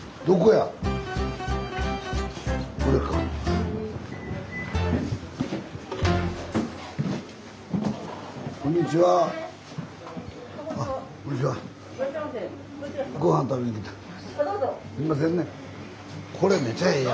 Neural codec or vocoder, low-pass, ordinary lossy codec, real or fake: none; none; none; real